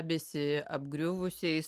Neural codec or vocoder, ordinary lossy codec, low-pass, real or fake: none; Opus, 32 kbps; 14.4 kHz; real